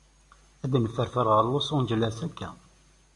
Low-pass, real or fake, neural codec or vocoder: 10.8 kHz; real; none